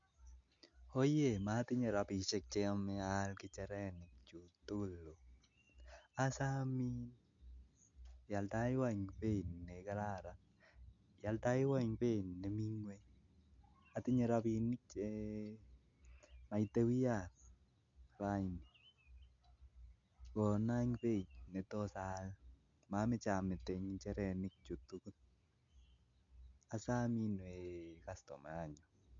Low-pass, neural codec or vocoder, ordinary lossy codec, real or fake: 7.2 kHz; none; MP3, 64 kbps; real